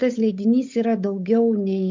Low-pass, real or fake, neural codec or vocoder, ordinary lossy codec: 7.2 kHz; fake; codec, 16 kHz, 8 kbps, FunCodec, trained on Chinese and English, 25 frames a second; MP3, 64 kbps